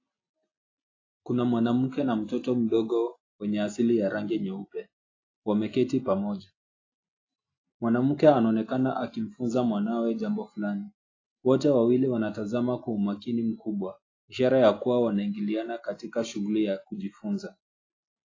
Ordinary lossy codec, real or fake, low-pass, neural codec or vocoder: AAC, 32 kbps; real; 7.2 kHz; none